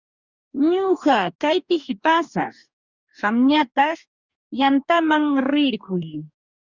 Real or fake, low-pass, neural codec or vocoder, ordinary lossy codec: fake; 7.2 kHz; codec, 44.1 kHz, 2.6 kbps, DAC; Opus, 64 kbps